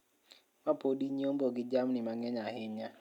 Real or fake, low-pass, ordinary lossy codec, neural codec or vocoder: real; 19.8 kHz; none; none